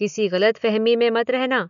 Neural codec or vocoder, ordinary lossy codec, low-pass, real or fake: none; none; 7.2 kHz; real